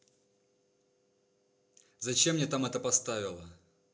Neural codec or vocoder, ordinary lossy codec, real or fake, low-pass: none; none; real; none